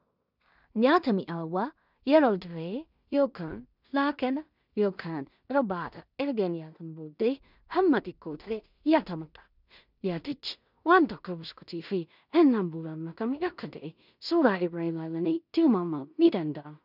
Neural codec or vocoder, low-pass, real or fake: codec, 16 kHz in and 24 kHz out, 0.4 kbps, LongCat-Audio-Codec, two codebook decoder; 5.4 kHz; fake